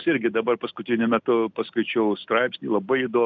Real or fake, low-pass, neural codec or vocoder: real; 7.2 kHz; none